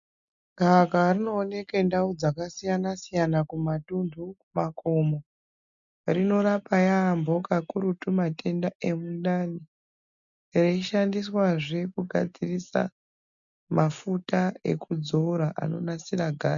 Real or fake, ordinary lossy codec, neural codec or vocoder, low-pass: real; MP3, 96 kbps; none; 7.2 kHz